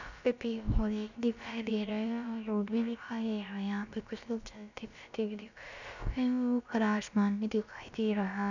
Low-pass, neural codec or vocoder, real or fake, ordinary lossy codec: 7.2 kHz; codec, 16 kHz, about 1 kbps, DyCAST, with the encoder's durations; fake; none